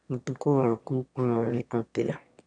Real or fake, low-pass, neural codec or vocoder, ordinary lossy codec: fake; 9.9 kHz; autoencoder, 22.05 kHz, a latent of 192 numbers a frame, VITS, trained on one speaker; none